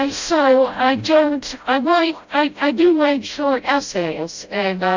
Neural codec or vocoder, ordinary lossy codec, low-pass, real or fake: codec, 16 kHz, 0.5 kbps, FreqCodec, smaller model; MP3, 64 kbps; 7.2 kHz; fake